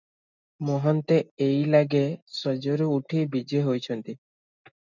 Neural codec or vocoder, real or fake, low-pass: none; real; 7.2 kHz